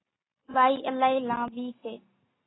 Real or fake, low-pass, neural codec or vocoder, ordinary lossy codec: real; 7.2 kHz; none; AAC, 16 kbps